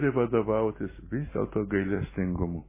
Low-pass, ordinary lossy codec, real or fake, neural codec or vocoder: 3.6 kHz; MP3, 16 kbps; real; none